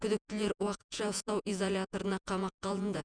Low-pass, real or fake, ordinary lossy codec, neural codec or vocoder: 9.9 kHz; fake; none; vocoder, 48 kHz, 128 mel bands, Vocos